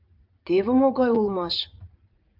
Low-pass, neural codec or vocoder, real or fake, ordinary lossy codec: 5.4 kHz; vocoder, 44.1 kHz, 80 mel bands, Vocos; fake; Opus, 24 kbps